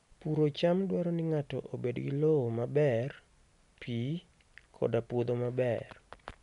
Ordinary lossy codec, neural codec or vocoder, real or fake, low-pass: none; none; real; 10.8 kHz